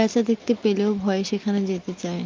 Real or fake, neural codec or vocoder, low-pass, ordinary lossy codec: real; none; 7.2 kHz; Opus, 32 kbps